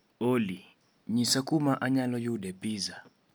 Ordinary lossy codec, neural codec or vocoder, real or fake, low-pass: none; none; real; none